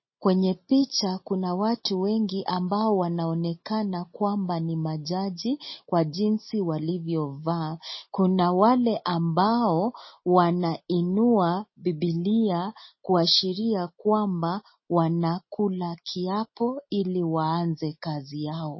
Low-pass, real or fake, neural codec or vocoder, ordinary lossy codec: 7.2 kHz; real; none; MP3, 24 kbps